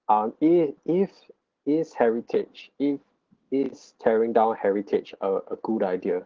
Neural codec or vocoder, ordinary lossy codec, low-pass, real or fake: none; Opus, 16 kbps; 7.2 kHz; real